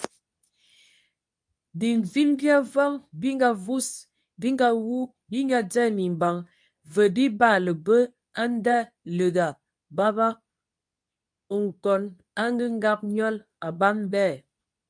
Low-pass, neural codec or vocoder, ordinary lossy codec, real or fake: 9.9 kHz; codec, 24 kHz, 0.9 kbps, WavTokenizer, medium speech release version 2; MP3, 96 kbps; fake